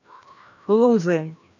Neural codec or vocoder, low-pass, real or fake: codec, 16 kHz, 1 kbps, FreqCodec, larger model; 7.2 kHz; fake